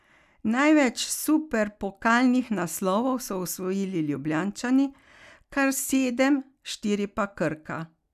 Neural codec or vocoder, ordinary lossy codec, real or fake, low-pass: none; none; real; 14.4 kHz